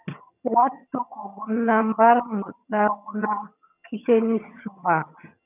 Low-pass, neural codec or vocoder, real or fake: 3.6 kHz; vocoder, 22.05 kHz, 80 mel bands, HiFi-GAN; fake